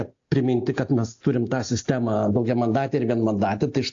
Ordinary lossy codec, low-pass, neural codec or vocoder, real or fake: AAC, 48 kbps; 7.2 kHz; none; real